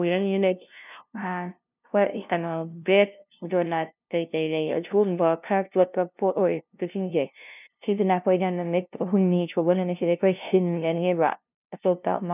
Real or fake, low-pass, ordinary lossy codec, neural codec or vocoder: fake; 3.6 kHz; none; codec, 16 kHz, 0.5 kbps, FunCodec, trained on LibriTTS, 25 frames a second